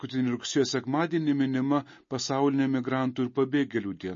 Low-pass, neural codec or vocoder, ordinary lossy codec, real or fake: 7.2 kHz; none; MP3, 32 kbps; real